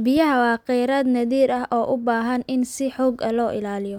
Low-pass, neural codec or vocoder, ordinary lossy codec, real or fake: 19.8 kHz; none; none; real